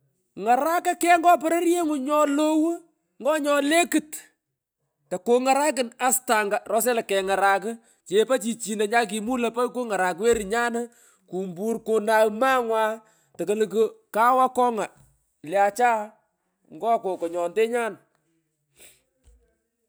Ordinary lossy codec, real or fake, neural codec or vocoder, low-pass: none; real; none; none